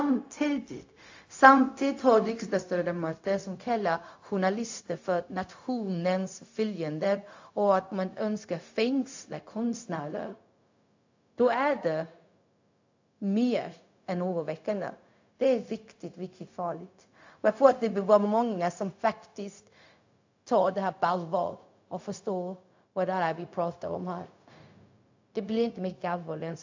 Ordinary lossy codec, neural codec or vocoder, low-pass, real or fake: AAC, 48 kbps; codec, 16 kHz, 0.4 kbps, LongCat-Audio-Codec; 7.2 kHz; fake